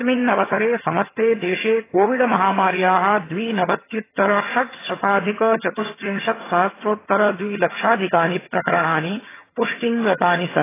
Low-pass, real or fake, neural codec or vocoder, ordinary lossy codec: 3.6 kHz; fake; vocoder, 22.05 kHz, 80 mel bands, HiFi-GAN; AAC, 16 kbps